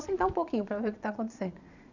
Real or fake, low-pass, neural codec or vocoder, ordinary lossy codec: fake; 7.2 kHz; vocoder, 22.05 kHz, 80 mel bands, Vocos; none